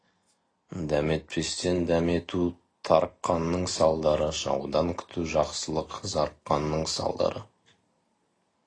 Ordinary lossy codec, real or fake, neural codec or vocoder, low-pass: AAC, 32 kbps; real; none; 9.9 kHz